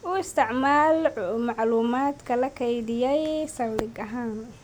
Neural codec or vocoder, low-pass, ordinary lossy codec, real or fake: none; none; none; real